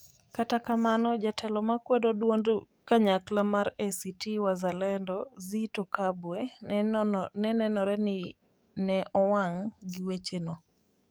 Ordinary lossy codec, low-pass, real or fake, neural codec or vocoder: none; none; fake; codec, 44.1 kHz, 7.8 kbps, DAC